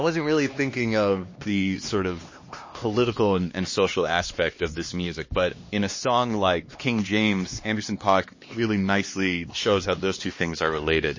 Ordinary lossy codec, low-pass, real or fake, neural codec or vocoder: MP3, 32 kbps; 7.2 kHz; fake; codec, 16 kHz, 2 kbps, X-Codec, HuBERT features, trained on LibriSpeech